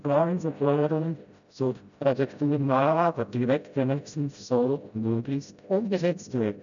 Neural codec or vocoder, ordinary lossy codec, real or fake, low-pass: codec, 16 kHz, 0.5 kbps, FreqCodec, smaller model; none; fake; 7.2 kHz